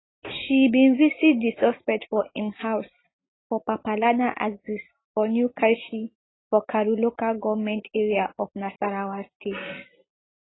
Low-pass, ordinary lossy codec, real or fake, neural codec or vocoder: 7.2 kHz; AAC, 16 kbps; real; none